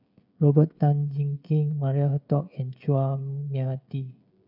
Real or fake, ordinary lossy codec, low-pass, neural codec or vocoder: fake; none; 5.4 kHz; codec, 16 kHz, 16 kbps, FreqCodec, smaller model